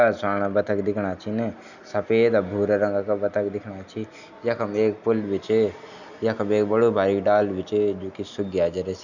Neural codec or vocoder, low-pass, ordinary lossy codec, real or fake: none; 7.2 kHz; none; real